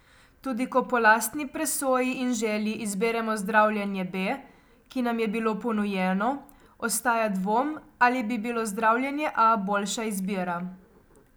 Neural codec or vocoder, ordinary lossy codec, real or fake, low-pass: none; none; real; none